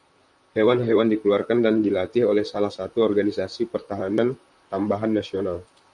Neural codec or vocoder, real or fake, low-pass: vocoder, 44.1 kHz, 128 mel bands, Pupu-Vocoder; fake; 10.8 kHz